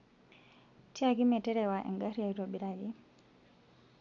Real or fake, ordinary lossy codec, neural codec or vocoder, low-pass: real; none; none; 7.2 kHz